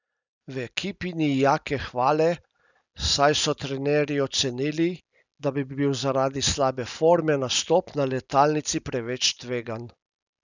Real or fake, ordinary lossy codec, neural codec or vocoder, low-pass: real; none; none; 7.2 kHz